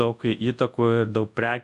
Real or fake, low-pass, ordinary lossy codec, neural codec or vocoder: fake; 10.8 kHz; AAC, 64 kbps; codec, 24 kHz, 0.9 kbps, WavTokenizer, large speech release